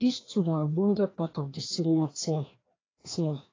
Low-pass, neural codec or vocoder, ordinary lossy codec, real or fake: 7.2 kHz; codec, 16 kHz, 1 kbps, FreqCodec, larger model; AAC, 32 kbps; fake